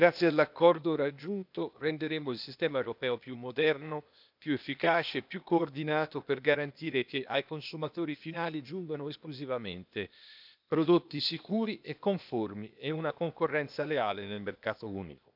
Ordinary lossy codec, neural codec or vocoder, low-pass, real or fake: none; codec, 16 kHz, 0.8 kbps, ZipCodec; 5.4 kHz; fake